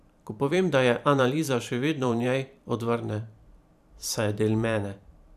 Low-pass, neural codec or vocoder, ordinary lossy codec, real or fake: 14.4 kHz; vocoder, 44.1 kHz, 128 mel bands every 512 samples, BigVGAN v2; none; fake